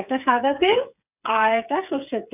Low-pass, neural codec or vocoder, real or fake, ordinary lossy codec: 3.6 kHz; codec, 16 kHz, 8 kbps, FreqCodec, smaller model; fake; none